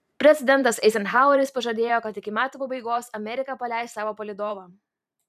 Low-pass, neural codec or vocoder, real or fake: 14.4 kHz; vocoder, 44.1 kHz, 128 mel bands every 512 samples, BigVGAN v2; fake